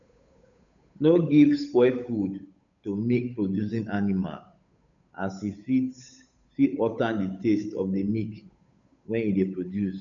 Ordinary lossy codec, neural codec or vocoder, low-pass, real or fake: none; codec, 16 kHz, 8 kbps, FunCodec, trained on Chinese and English, 25 frames a second; 7.2 kHz; fake